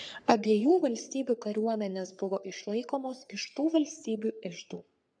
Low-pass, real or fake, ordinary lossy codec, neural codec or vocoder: 9.9 kHz; fake; MP3, 96 kbps; codec, 44.1 kHz, 3.4 kbps, Pupu-Codec